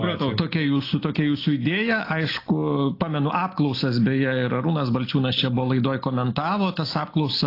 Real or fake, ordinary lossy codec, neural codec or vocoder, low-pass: real; AAC, 32 kbps; none; 5.4 kHz